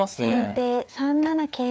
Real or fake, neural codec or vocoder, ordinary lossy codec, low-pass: fake; codec, 16 kHz, 4 kbps, FreqCodec, larger model; none; none